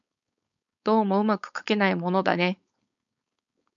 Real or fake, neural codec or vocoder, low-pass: fake; codec, 16 kHz, 4.8 kbps, FACodec; 7.2 kHz